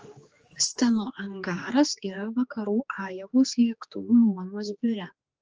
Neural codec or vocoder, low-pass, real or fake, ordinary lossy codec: codec, 16 kHz, 2 kbps, X-Codec, HuBERT features, trained on general audio; 7.2 kHz; fake; Opus, 24 kbps